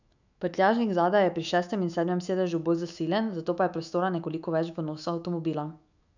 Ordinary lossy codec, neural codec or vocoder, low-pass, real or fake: none; autoencoder, 48 kHz, 128 numbers a frame, DAC-VAE, trained on Japanese speech; 7.2 kHz; fake